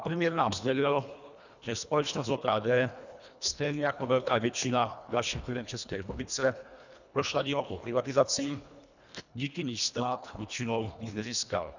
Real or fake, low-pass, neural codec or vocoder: fake; 7.2 kHz; codec, 24 kHz, 1.5 kbps, HILCodec